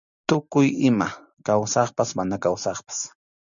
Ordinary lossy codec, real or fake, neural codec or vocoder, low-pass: MP3, 96 kbps; real; none; 7.2 kHz